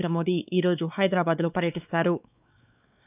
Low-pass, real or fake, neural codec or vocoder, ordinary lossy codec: 3.6 kHz; fake; codec, 16 kHz, 4 kbps, X-Codec, WavLM features, trained on Multilingual LibriSpeech; none